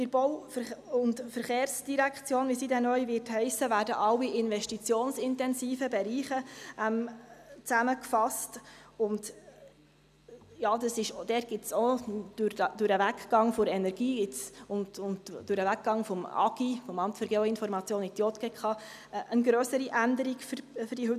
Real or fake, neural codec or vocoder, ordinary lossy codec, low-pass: real; none; none; 14.4 kHz